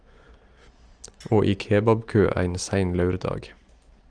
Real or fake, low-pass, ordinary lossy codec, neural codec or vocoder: real; 9.9 kHz; Opus, 24 kbps; none